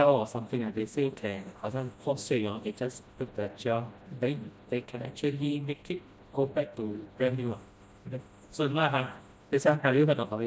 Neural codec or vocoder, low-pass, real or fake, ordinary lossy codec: codec, 16 kHz, 1 kbps, FreqCodec, smaller model; none; fake; none